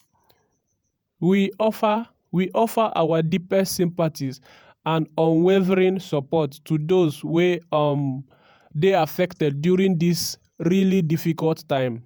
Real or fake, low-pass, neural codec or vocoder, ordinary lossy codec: fake; none; vocoder, 48 kHz, 128 mel bands, Vocos; none